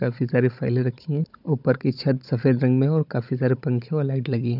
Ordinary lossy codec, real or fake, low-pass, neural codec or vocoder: none; fake; 5.4 kHz; codec, 16 kHz, 16 kbps, FunCodec, trained on Chinese and English, 50 frames a second